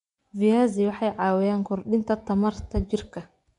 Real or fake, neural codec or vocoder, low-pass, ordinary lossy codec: fake; vocoder, 24 kHz, 100 mel bands, Vocos; 10.8 kHz; none